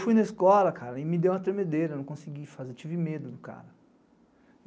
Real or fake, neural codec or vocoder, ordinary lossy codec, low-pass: real; none; none; none